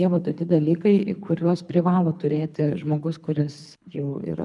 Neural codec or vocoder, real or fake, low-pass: codec, 24 kHz, 3 kbps, HILCodec; fake; 10.8 kHz